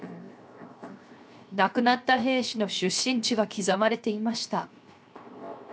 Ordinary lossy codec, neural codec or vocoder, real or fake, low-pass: none; codec, 16 kHz, 0.7 kbps, FocalCodec; fake; none